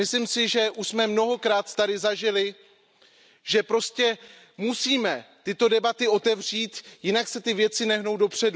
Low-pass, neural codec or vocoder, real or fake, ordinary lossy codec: none; none; real; none